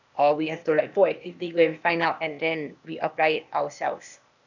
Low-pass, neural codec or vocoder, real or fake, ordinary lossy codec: 7.2 kHz; codec, 16 kHz, 0.8 kbps, ZipCodec; fake; none